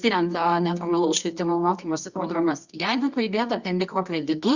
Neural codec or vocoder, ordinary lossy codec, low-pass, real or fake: codec, 24 kHz, 0.9 kbps, WavTokenizer, medium music audio release; Opus, 64 kbps; 7.2 kHz; fake